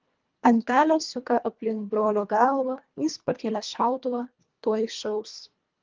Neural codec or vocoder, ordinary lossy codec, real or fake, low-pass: codec, 24 kHz, 1.5 kbps, HILCodec; Opus, 24 kbps; fake; 7.2 kHz